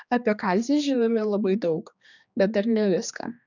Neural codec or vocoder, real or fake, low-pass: codec, 16 kHz, 2 kbps, X-Codec, HuBERT features, trained on general audio; fake; 7.2 kHz